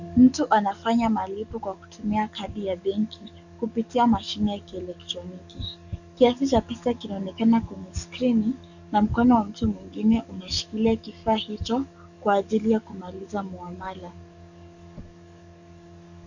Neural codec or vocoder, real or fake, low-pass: codec, 44.1 kHz, 7.8 kbps, DAC; fake; 7.2 kHz